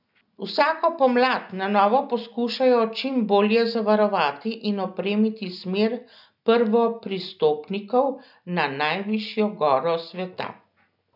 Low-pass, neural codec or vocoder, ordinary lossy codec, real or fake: 5.4 kHz; none; none; real